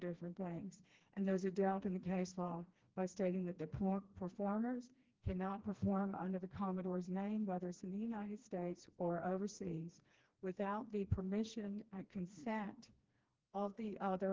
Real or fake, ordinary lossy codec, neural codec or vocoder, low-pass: fake; Opus, 16 kbps; codec, 16 kHz, 2 kbps, FreqCodec, smaller model; 7.2 kHz